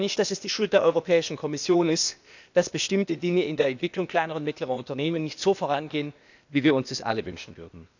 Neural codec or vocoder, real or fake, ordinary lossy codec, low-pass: codec, 16 kHz, 0.8 kbps, ZipCodec; fake; none; 7.2 kHz